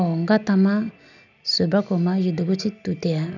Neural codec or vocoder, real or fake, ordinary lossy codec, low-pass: none; real; none; 7.2 kHz